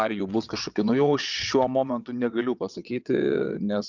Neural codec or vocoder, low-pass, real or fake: vocoder, 22.05 kHz, 80 mel bands, WaveNeXt; 7.2 kHz; fake